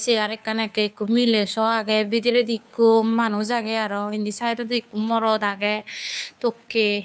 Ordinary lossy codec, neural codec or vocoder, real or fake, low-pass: none; codec, 16 kHz, 2 kbps, FunCodec, trained on Chinese and English, 25 frames a second; fake; none